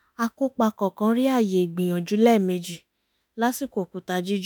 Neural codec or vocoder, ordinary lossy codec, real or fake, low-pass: autoencoder, 48 kHz, 32 numbers a frame, DAC-VAE, trained on Japanese speech; none; fake; none